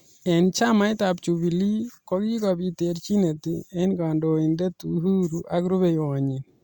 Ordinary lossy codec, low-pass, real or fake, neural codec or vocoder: Opus, 64 kbps; 19.8 kHz; real; none